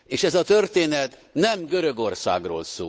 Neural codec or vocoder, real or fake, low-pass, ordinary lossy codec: codec, 16 kHz, 8 kbps, FunCodec, trained on Chinese and English, 25 frames a second; fake; none; none